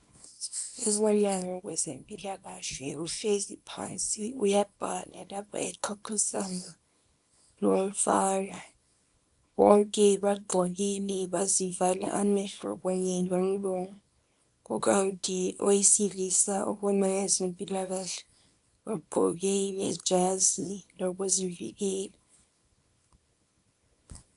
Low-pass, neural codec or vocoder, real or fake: 10.8 kHz; codec, 24 kHz, 0.9 kbps, WavTokenizer, small release; fake